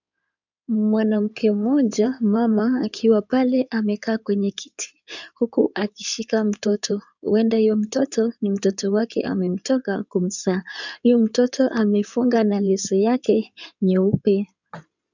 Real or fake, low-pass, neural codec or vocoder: fake; 7.2 kHz; codec, 16 kHz in and 24 kHz out, 2.2 kbps, FireRedTTS-2 codec